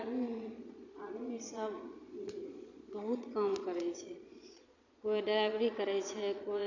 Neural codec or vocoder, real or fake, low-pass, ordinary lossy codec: vocoder, 22.05 kHz, 80 mel bands, Vocos; fake; 7.2 kHz; none